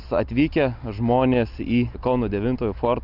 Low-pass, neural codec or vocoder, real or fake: 5.4 kHz; none; real